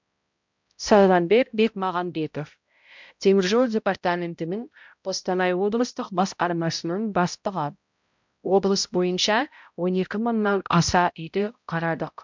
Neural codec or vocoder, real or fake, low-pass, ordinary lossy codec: codec, 16 kHz, 0.5 kbps, X-Codec, HuBERT features, trained on balanced general audio; fake; 7.2 kHz; MP3, 64 kbps